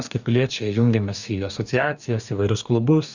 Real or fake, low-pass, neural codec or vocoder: fake; 7.2 kHz; codec, 44.1 kHz, 2.6 kbps, DAC